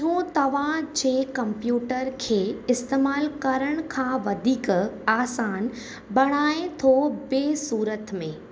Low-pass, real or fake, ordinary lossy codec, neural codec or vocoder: none; real; none; none